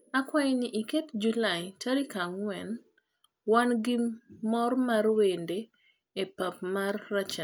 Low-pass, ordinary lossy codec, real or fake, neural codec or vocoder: none; none; real; none